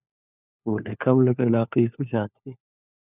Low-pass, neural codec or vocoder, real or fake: 3.6 kHz; codec, 16 kHz, 4 kbps, FunCodec, trained on LibriTTS, 50 frames a second; fake